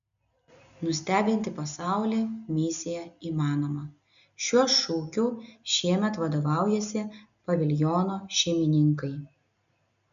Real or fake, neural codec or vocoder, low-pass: real; none; 7.2 kHz